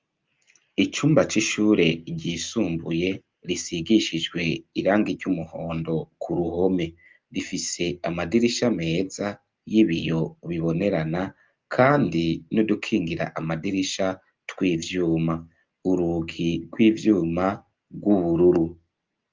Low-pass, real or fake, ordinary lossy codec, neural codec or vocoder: 7.2 kHz; real; Opus, 32 kbps; none